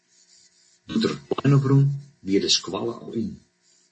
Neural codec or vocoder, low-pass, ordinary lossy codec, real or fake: none; 10.8 kHz; MP3, 32 kbps; real